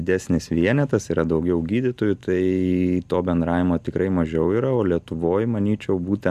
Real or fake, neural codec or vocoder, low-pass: real; none; 14.4 kHz